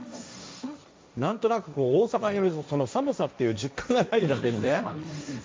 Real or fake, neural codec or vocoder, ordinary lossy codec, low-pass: fake; codec, 16 kHz, 1.1 kbps, Voila-Tokenizer; none; none